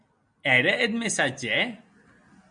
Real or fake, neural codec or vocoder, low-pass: real; none; 9.9 kHz